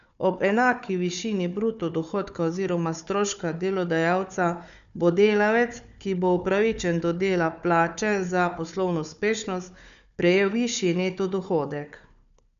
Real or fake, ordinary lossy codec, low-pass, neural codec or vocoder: fake; none; 7.2 kHz; codec, 16 kHz, 4 kbps, FunCodec, trained on Chinese and English, 50 frames a second